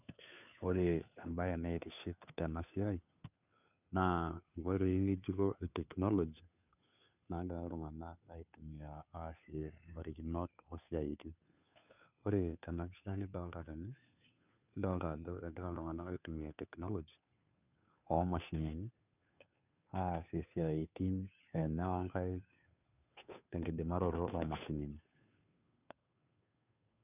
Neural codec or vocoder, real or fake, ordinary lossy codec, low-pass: codec, 16 kHz, 2 kbps, FunCodec, trained on Chinese and English, 25 frames a second; fake; none; 3.6 kHz